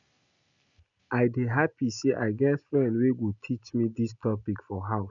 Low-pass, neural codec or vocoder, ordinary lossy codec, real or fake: 7.2 kHz; none; none; real